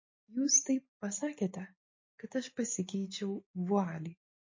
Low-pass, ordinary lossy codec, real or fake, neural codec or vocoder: 7.2 kHz; MP3, 32 kbps; real; none